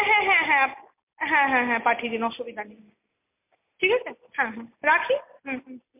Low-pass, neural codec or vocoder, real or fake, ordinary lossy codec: 3.6 kHz; none; real; MP3, 32 kbps